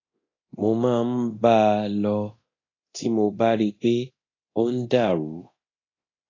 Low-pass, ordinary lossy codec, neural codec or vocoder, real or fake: 7.2 kHz; AAC, 32 kbps; codec, 24 kHz, 0.9 kbps, DualCodec; fake